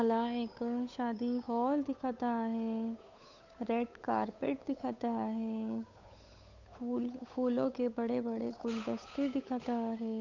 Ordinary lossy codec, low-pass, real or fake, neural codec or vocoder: none; 7.2 kHz; fake; codec, 16 kHz, 8 kbps, FunCodec, trained on Chinese and English, 25 frames a second